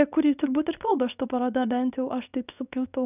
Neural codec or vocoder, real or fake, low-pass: codec, 24 kHz, 0.9 kbps, WavTokenizer, medium speech release version 2; fake; 3.6 kHz